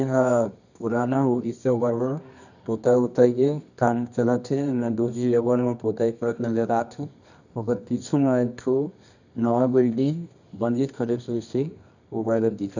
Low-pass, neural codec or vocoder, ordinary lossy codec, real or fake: 7.2 kHz; codec, 24 kHz, 0.9 kbps, WavTokenizer, medium music audio release; none; fake